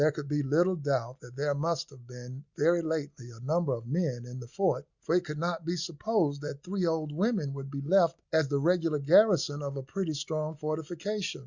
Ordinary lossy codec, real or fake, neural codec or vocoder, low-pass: Opus, 64 kbps; real; none; 7.2 kHz